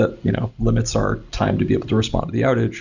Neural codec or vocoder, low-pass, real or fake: none; 7.2 kHz; real